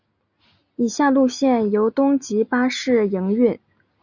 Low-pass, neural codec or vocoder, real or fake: 7.2 kHz; none; real